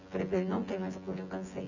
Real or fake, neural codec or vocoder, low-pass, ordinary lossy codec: fake; vocoder, 24 kHz, 100 mel bands, Vocos; 7.2 kHz; none